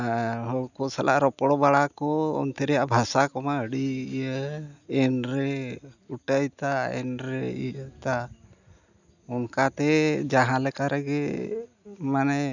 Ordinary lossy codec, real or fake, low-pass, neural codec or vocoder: none; real; 7.2 kHz; none